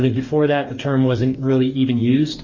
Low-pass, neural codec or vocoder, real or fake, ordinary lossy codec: 7.2 kHz; codec, 44.1 kHz, 2.6 kbps, DAC; fake; MP3, 32 kbps